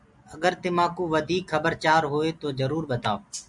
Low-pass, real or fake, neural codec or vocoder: 10.8 kHz; real; none